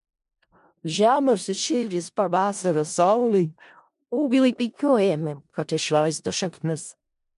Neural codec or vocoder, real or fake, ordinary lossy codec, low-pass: codec, 16 kHz in and 24 kHz out, 0.4 kbps, LongCat-Audio-Codec, four codebook decoder; fake; MP3, 64 kbps; 10.8 kHz